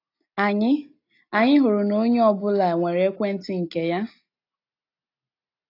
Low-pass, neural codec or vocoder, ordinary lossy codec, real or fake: 5.4 kHz; none; AAC, 32 kbps; real